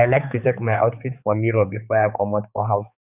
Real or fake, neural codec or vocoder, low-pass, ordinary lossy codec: fake; codec, 16 kHz, 4 kbps, X-Codec, HuBERT features, trained on balanced general audio; 3.6 kHz; none